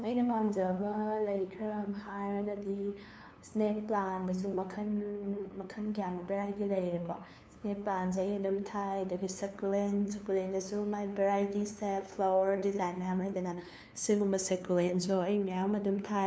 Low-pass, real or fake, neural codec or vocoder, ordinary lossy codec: none; fake; codec, 16 kHz, 2 kbps, FunCodec, trained on LibriTTS, 25 frames a second; none